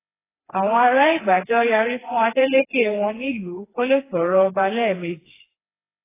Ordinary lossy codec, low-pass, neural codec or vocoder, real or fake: AAC, 16 kbps; 3.6 kHz; codec, 16 kHz, 2 kbps, FreqCodec, smaller model; fake